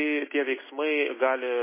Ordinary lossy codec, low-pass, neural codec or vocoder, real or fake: MP3, 16 kbps; 3.6 kHz; none; real